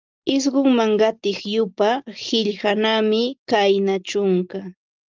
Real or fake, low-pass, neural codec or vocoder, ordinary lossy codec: real; 7.2 kHz; none; Opus, 24 kbps